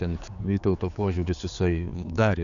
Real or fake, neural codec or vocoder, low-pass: fake; codec, 16 kHz, 4 kbps, X-Codec, HuBERT features, trained on general audio; 7.2 kHz